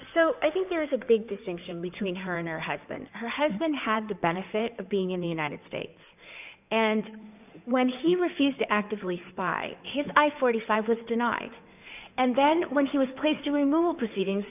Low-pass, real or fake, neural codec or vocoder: 3.6 kHz; fake; codec, 16 kHz in and 24 kHz out, 2.2 kbps, FireRedTTS-2 codec